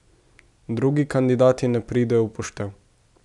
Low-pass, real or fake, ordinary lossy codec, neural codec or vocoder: 10.8 kHz; real; none; none